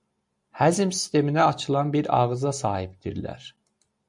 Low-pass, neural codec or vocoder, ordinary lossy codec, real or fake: 10.8 kHz; none; MP3, 64 kbps; real